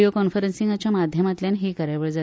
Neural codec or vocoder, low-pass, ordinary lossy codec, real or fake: none; none; none; real